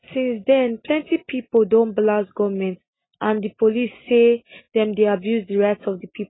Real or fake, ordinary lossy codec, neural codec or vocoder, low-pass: real; AAC, 16 kbps; none; 7.2 kHz